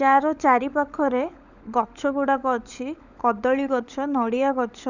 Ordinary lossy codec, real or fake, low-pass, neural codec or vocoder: none; fake; 7.2 kHz; codec, 16 kHz, 16 kbps, FunCodec, trained on LibriTTS, 50 frames a second